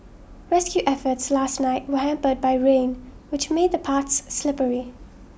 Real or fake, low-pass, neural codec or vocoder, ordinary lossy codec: real; none; none; none